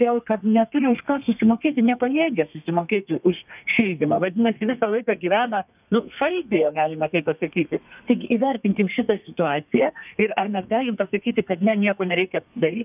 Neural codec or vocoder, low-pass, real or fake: codec, 32 kHz, 1.9 kbps, SNAC; 3.6 kHz; fake